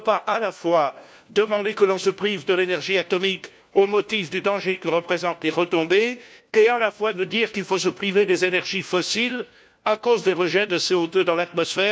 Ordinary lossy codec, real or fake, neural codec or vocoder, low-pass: none; fake; codec, 16 kHz, 1 kbps, FunCodec, trained on LibriTTS, 50 frames a second; none